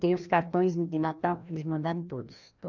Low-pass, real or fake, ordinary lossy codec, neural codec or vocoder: 7.2 kHz; fake; none; codec, 16 kHz, 1 kbps, FreqCodec, larger model